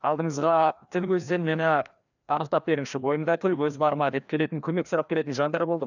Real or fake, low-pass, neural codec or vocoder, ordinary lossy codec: fake; 7.2 kHz; codec, 16 kHz, 1 kbps, FreqCodec, larger model; none